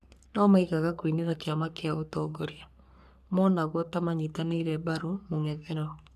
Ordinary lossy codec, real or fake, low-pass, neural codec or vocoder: none; fake; 14.4 kHz; codec, 44.1 kHz, 3.4 kbps, Pupu-Codec